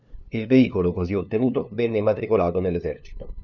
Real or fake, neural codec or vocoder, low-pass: fake; codec, 16 kHz, 2 kbps, FunCodec, trained on LibriTTS, 25 frames a second; 7.2 kHz